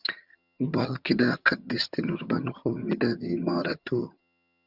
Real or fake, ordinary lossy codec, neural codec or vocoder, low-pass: fake; Opus, 64 kbps; vocoder, 22.05 kHz, 80 mel bands, HiFi-GAN; 5.4 kHz